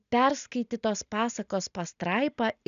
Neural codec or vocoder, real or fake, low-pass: none; real; 7.2 kHz